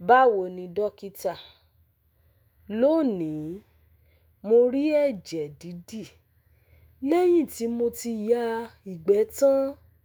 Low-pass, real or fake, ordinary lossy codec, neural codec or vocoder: none; fake; none; autoencoder, 48 kHz, 128 numbers a frame, DAC-VAE, trained on Japanese speech